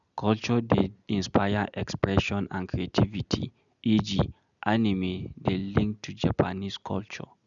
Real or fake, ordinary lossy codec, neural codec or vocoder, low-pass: real; none; none; 7.2 kHz